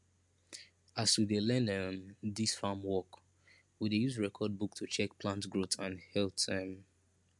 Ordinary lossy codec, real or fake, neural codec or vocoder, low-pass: MP3, 64 kbps; real; none; 10.8 kHz